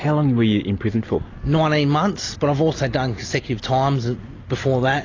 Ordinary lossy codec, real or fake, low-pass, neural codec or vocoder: AAC, 32 kbps; real; 7.2 kHz; none